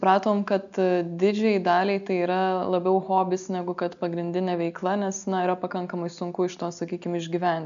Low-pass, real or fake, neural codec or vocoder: 7.2 kHz; real; none